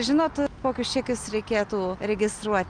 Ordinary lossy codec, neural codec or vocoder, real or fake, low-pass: Opus, 32 kbps; none; real; 9.9 kHz